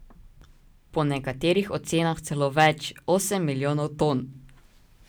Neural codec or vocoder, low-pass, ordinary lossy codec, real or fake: none; none; none; real